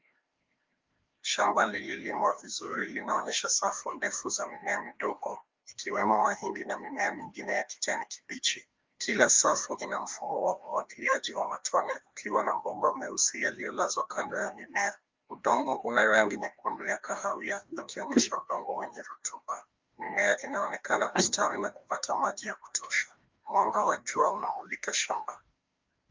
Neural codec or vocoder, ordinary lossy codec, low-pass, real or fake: codec, 16 kHz, 1 kbps, FreqCodec, larger model; Opus, 32 kbps; 7.2 kHz; fake